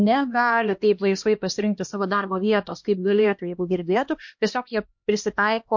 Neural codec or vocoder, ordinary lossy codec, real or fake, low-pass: codec, 16 kHz, 1 kbps, X-Codec, HuBERT features, trained on LibriSpeech; MP3, 48 kbps; fake; 7.2 kHz